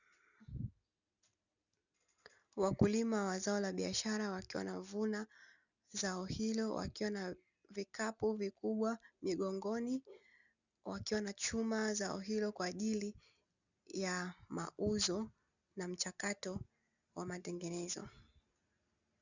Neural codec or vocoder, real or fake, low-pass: none; real; 7.2 kHz